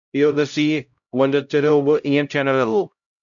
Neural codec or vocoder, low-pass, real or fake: codec, 16 kHz, 0.5 kbps, X-Codec, HuBERT features, trained on LibriSpeech; 7.2 kHz; fake